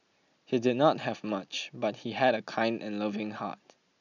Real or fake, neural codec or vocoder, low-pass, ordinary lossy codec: real; none; 7.2 kHz; none